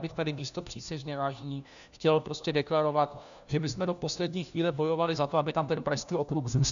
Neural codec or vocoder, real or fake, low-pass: codec, 16 kHz, 1 kbps, FunCodec, trained on LibriTTS, 50 frames a second; fake; 7.2 kHz